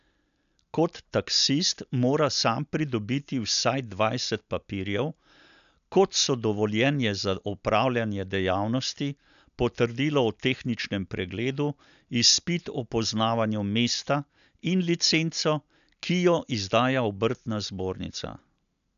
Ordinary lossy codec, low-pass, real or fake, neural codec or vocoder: none; 7.2 kHz; real; none